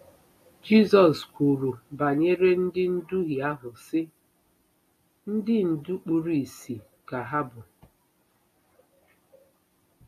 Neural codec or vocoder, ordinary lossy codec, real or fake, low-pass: none; AAC, 48 kbps; real; 14.4 kHz